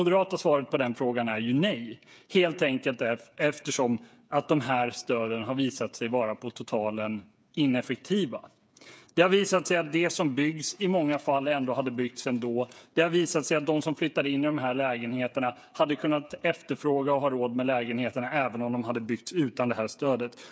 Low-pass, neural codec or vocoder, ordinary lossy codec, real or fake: none; codec, 16 kHz, 8 kbps, FreqCodec, smaller model; none; fake